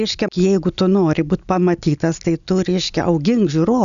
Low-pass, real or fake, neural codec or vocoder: 7.2 kHz; real; none